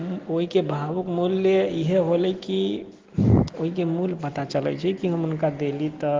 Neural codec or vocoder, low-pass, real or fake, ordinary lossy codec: none; 7.2 kHz; real; Opus, 16 kbps